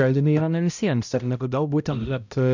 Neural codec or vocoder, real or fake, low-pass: codec, 16 kHz, 0.5 kbps, X-Codec, HuBERT features, trained on balanced general audio; fake; 7.2 kHz